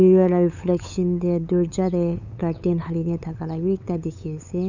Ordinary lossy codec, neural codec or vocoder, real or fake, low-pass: none; codec, 16 kHz, 8 kbps, FunCodec, trained on LibriTTS, 25 frames a second; fake; 7.2 kHz